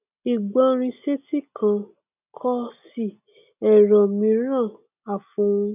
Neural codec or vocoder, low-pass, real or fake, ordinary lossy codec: none; 3.6 kHz; real; none